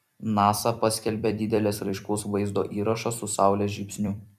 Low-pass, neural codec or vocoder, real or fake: 14.4 kHz; vocoder, 44.1 kHz, 128 mel bands every 256 samples, BigVGAN v2; fake